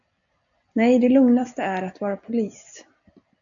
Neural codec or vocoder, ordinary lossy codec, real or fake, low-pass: none; MP3, 64 kbps; real; 7.2 kHz